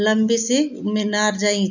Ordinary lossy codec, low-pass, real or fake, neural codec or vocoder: none; 7.2 kHz; real; none